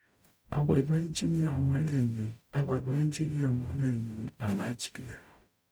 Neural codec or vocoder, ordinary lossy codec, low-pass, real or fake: codec, 44.1 kHz, 0.9 kbps, DAC; none; none; fake